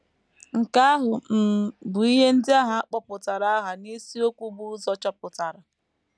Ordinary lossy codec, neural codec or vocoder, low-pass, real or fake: none; none; none; real